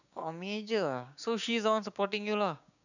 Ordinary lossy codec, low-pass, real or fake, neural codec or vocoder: none; 7.2 kHz; fake; codec, 16 kHz, 6 kbps, DAC